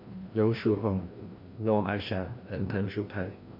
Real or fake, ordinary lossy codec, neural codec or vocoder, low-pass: fake; MP3, 32 kbps; codec, 16 kHz, 1 kbps, FreqCodec, larger model; 5.4 kHz